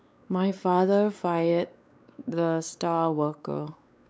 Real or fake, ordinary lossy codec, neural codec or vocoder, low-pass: fake; none; codec, 16 kHz, 4 kbps, X-Codec, WavLM features, trained on Multilingual LibriSpeech; none